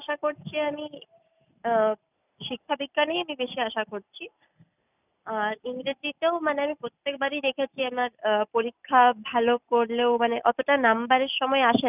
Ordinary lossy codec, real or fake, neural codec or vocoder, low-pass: none; real; none; 3.6 kHz